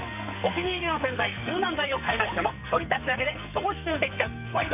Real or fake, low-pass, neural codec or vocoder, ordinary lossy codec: fake; 3.6 kHz; codec, 44.1 kHz, 2.6 kbps, SNAC; none